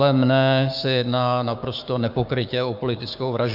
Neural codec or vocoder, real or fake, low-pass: codec, 16 kHz, 6 kbps, DAC; fake; 5.4 kHz